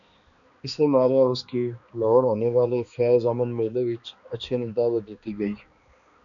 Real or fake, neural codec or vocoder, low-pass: fake; codec, 16 kHz, 2 kbps, X-Codec, HuBERT features, trained on balanced general audio; 7.2 kHz